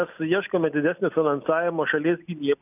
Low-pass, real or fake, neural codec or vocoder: 3.6 kHz; real; none